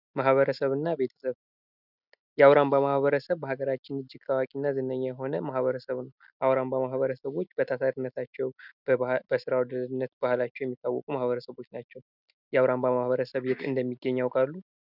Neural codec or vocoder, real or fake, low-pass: none; real; 5.4 kHz